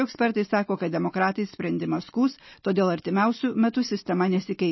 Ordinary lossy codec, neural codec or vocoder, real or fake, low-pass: MP3, 24 kbps; none; real; 7.2 kHz